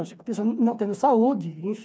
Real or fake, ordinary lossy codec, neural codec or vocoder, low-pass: fake; none; codec, 16 kHz, 4 kbps, FreqCodec, smaller model; none